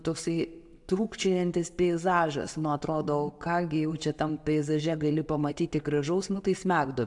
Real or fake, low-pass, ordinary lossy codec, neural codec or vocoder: real; 10.8 kHz; AAC, 64 kbps; none